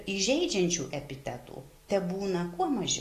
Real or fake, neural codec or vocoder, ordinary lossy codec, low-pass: real; none; AAC, 48 kbps; 14.4 kHz